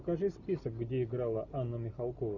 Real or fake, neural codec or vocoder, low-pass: real; none; 7.2 kHz